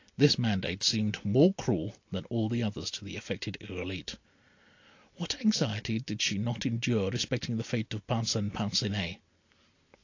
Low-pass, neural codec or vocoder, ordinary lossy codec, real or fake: 7.2 kHz; none; AAC, 48 kbps; real